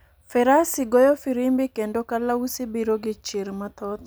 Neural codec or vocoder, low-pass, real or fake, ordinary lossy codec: none; none; real; none